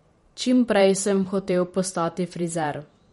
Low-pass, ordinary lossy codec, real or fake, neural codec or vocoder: 19.8 kHz; MP3, 48 kbps; fake; vocoder, 44.1 kHz, 128 mel bands every 512 samples, BigVGAN v2